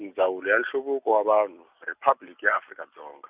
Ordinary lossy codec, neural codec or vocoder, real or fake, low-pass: Opus, 64 kbps; none; real; 3.6 kHz